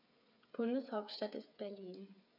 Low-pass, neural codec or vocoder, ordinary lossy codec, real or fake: 5.4 kHz; codec, 16 kHz, 8 kbps, FreqCodec, smaller model; none; fake